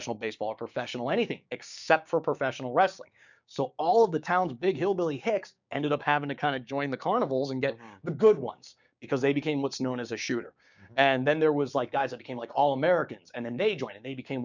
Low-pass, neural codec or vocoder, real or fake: 7.2 kHz; codec, 16 kHz, 6 kbps, DAC; fake